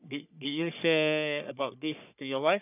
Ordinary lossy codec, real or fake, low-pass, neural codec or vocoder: none; fake; 3.6 kHz; codec, 44.1 kHz, 3.4 kbps, Pupu-Codec